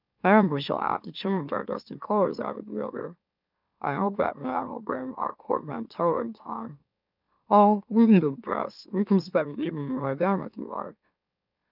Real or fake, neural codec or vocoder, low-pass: fake; autoencoder, 44.1 kHz, a latent of 192 numbers a frame, MeloTTS; 5.4 kHz